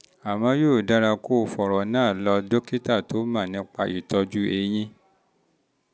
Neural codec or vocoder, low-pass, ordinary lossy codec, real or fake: none; none; none; real